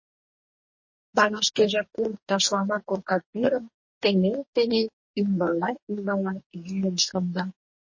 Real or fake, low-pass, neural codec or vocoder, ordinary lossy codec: fake; 7.2 kHz; codec, 44.1 kHz, 2.6 kbps, DAC; MP3, 32 kbps